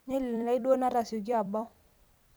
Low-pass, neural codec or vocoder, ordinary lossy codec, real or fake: none; vocoder, 44.1 kHz, 128 mel bands every 256 samples, BigVGAN v2; none; fake